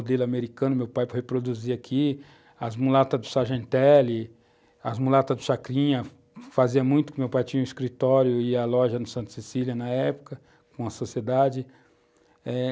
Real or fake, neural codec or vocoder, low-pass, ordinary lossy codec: real; none; none; none